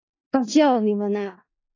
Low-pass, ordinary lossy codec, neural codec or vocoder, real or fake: 7.2 kHz; AAC, 32 kbps; codec, 16 kHz in and 24 kHz out, 0.4 kbps, LongCat-Audio-Codec, four codebook decoder; fake